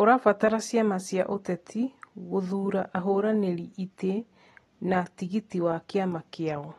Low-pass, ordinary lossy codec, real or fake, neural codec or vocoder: 19.8 kHz; AAC, 32 kbps; real; none